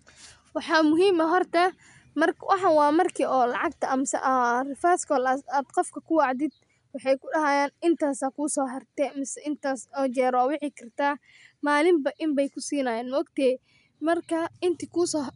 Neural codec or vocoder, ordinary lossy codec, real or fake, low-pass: none; MP3, 96 kbps; real; 10.8 kHz